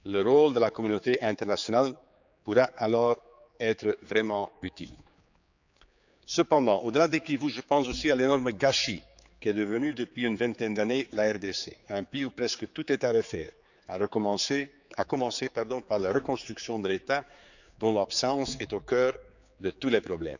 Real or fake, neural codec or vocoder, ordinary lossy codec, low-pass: fake; codec, 16 kHz, 4 kbps, X-Codec, HuBERT features, trained on general audio; none; 7.2 kHz